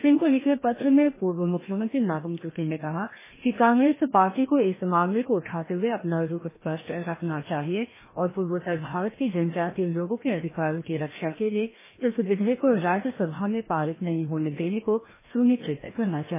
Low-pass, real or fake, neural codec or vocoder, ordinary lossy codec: 3.6 kHz; fake; codec, 16 kHz, 1 kbps, FreqCodec, larger model; MP3, 16 kbps